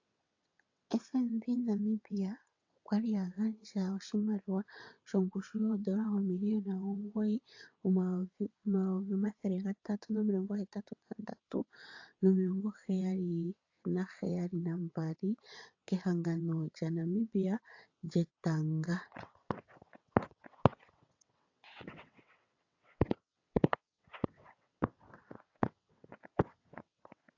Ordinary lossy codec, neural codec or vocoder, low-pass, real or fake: AAC, 48 kbps; vocoder, 22.05 kHz, 80 mel bands, WaveNeXt; 7.2 kHz; fake